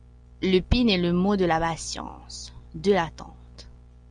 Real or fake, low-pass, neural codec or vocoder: real; 9.9 kHz; none